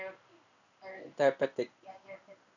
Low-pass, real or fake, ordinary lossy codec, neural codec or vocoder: 7.2 kHz; fake; none; codec, 16 kHz in and 24 kHz out, 1 kbps, XY-Tokenizer